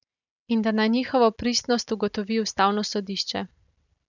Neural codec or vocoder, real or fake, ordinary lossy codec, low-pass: none; real; none; 7.2 kHz